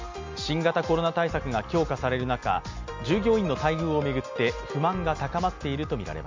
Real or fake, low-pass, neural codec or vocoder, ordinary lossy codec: real; 7.2 kHz; none; MP3, 64 kbps